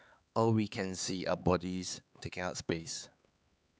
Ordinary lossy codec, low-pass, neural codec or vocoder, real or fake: none; none; codec, 16 kHz, 4 kbps, X-Codec, HuBERT features, trained on LibriSpeech; fake